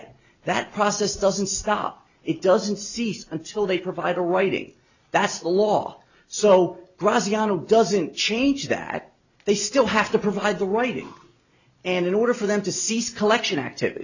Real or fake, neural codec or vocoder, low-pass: real; none; 7.2 kHz